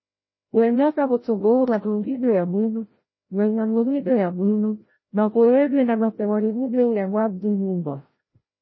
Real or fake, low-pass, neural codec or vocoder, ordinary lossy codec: fake; 7.2 kHz; codec, 16 kHz, 0.5 kbps, FreqCodec, larger model; MP3, 24 kbps